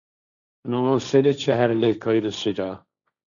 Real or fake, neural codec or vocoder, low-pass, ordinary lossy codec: fake; codec, 16 kHz, 1.1 kbps, Voila-Tokenizer; 7.2 kHz; MP3, 96 kbps